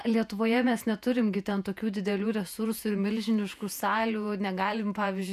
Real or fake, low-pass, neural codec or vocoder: fake; 14.4 kHz; vocoder, 48 kHz, 128 mel bands, Vocos